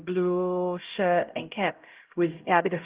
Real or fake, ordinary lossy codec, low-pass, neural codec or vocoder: fake; Opus, 16 kbps; 3.6 kHz; codec, 16 kHz, 0.5 kbps, X-Codec, HuBERT features, trained on LibriSpeech